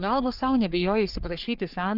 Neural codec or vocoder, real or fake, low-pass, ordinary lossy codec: codec, 44.1 kHz, 3.4 kbps, Pupu-Codec; fake; 5.4 kHz; Opus, 24 kbps